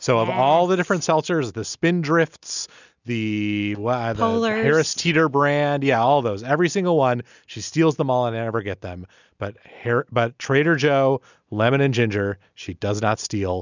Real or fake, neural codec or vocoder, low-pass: real; none; 7.2 kHz